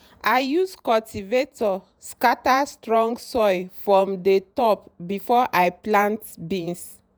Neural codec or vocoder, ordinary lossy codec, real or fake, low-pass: vocoder, 48 kHz, 128 mel bands, Vocos; none; fake; none